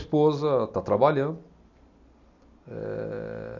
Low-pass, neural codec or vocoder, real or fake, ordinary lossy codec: 7.2 kHz; none; real; none